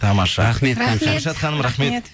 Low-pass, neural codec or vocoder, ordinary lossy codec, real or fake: none; none; none; real